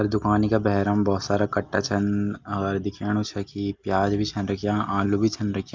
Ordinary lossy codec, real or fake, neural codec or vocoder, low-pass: Opus, 24 kbps; real; none; 7.2 kHz